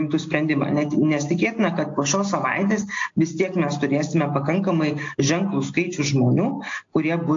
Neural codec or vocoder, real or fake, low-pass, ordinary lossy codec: none; real; 7.2 kHz; AAC, 48 kbps